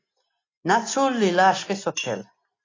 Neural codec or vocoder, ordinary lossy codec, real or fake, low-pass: none; AAC, 32 kbps; real; 7.2 kHz